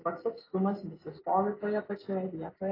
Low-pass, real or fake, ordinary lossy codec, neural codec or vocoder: 5.4 kHz; real; AAC, 24 kbps; none